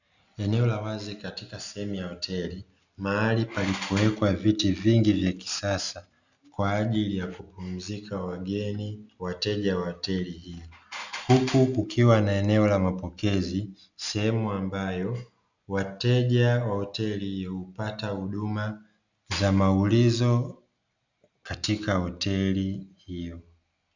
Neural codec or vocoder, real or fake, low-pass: none; real; 7.2 kHz